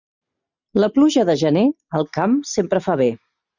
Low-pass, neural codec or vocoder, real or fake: 7.2 kHz; none; real